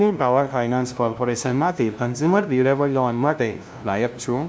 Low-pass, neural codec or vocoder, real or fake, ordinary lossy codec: none; codec, 16 kHz, 0.5 kbps, FunCodec, trained on LibriTTS, 25 frames a second; fake; none